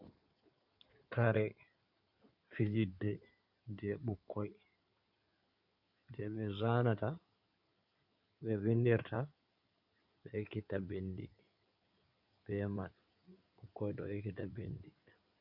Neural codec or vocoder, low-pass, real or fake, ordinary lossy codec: codec, 16 kHz in and 24 kHz out, 2.2 kbps, FireRedTTS-2 codec; 5.4 kHz; fake; Opus, 64 kbps